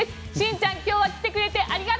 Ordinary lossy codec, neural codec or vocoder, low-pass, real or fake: none; none; none; real